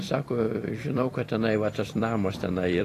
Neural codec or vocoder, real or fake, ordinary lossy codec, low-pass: vocoder, 44.1 kHz, 128 mel bands every 256 samples, BigVGAN v2; fake; AAC, 48 kbps; 14.4 kHz